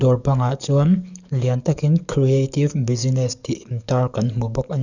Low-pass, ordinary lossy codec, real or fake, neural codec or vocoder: 7.2 kHz; none; fake; codec, 44.1 kHz, 7.8 kbps, DAC